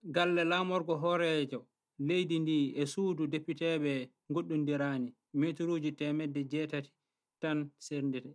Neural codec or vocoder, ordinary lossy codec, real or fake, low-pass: none; none; real; none